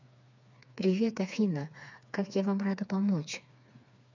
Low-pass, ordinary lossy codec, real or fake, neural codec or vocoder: 7.2 kHz; none; fake; codec, 16 kHz, 4 kbps, FreqCodec, smaller model